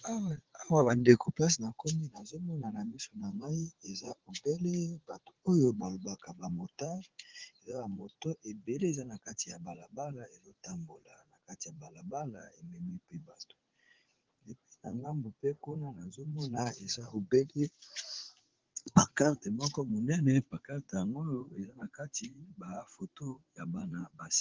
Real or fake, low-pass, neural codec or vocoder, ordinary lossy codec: fake; 7.2 kHz; vocoder, 44.1 kHz, 80 mel bands, Vocos; Opus, 16 kbps